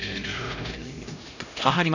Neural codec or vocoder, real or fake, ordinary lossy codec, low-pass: codec, 16 kHz, 0.5 kbps, X-Codec, HuBERT features, trained on LibriSpeech; fake; none; 7.2 kHz